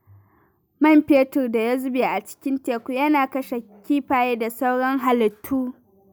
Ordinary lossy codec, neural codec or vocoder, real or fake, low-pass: none; none; real; none